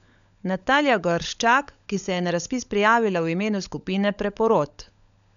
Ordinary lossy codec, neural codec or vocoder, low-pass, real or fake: none; codec, 16 kHz, 16 kbps, FunCodec, trained on LibriTTS, 50 frames a second; 7.2 kHz; fake